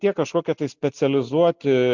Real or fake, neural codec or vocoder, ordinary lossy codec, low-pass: real; none; MP3, 64 kbps; 7.2 kHz